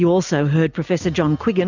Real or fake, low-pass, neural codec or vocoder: real; 7.2 kHz; none